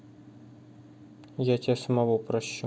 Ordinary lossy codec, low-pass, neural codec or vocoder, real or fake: none; none; none; real